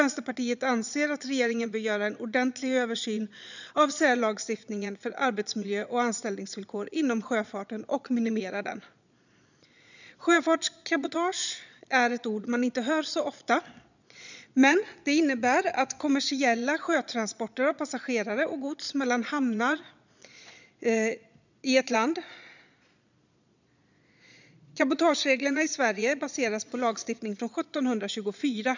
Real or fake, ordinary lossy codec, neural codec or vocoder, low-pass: fake; none; vocoder, 22.05 kHz, 80 mel bands, Vocos; 7.2 kHz